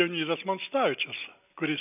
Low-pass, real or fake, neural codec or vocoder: 3.6 kHz; real; none